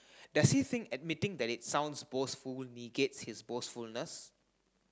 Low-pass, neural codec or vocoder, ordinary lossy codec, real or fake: none; none; none; real